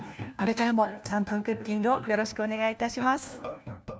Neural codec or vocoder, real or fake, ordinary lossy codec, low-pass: codec, 16 kHz, 1 kbps, FunCodec, trained on LibriTTS, 50 frames a second; fake; none; none